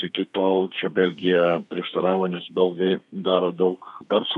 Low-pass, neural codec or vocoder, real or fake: 10.8 kHz; codec, 32 kHz, 1.9 kbps, SNAC; fake